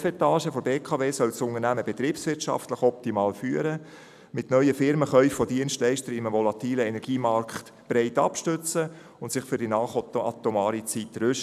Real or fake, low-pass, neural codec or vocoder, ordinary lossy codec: real; 14.4 kHz; none; none